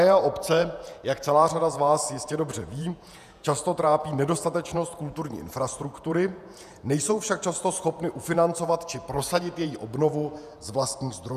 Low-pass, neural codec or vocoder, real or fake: 14.4 kHz; vocoder, 48 kHz, 128 mel bands, Vocos; fake